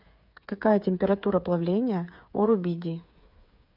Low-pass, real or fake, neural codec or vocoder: 5.4 kHz; fake; codec, 16 kHz, 8 kbps, FreqCodec, smaller model